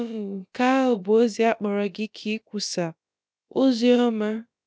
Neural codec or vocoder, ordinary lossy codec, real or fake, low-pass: codec, 16 kHz, about 1 kbps, DyCAST, with the encoder's durations; none; fake; none